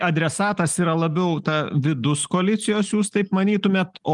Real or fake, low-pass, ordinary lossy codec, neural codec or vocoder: real; 10.8 kHz; Opus, 32 kbps; none